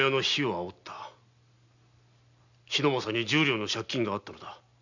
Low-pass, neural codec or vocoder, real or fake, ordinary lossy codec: 7.2 kHz; none; real; none